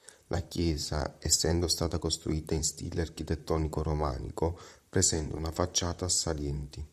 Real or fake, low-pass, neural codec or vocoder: fake; 14.4 kHz; vocoder, 44.1 kHz, 128 mel bands, Pupu-Vocoder